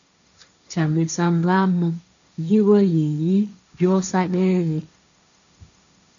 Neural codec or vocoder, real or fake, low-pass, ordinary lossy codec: codec, 16 kHz, 1.1 kbps, Voila-Tokenizer; fake; 7.2 kHz; MP3, 96 kbps